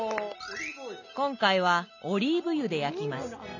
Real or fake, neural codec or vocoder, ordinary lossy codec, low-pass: real; none; none; 7.2 kHz